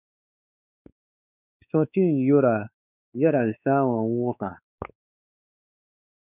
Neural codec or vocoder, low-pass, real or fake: codec, 16 kHz, 4 kbps, X-Codec, WavLM features, trained on Multilingual LibriSpeech; 3.6 kHz; fake